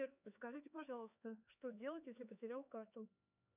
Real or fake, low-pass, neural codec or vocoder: fake; 3.6 kHz; codec, 16 kHz, 4 kbps, X-Codec, HuBERT features, trained on LibriSpeech